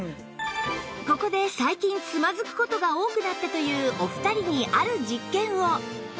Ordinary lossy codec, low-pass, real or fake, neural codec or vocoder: none; none; real; none